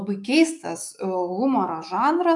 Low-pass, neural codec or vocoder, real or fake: 10.8 kHz; autoencoder, 48 kHz, 128 numbers a frame, DAC-VAE, trained on Japanese speech; fake